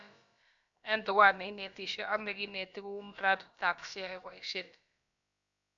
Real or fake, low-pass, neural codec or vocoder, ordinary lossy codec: fake; 7.2 kHz; codec, 16 kHz, about 1 kbps, DyCAST, with the encoder's durations; none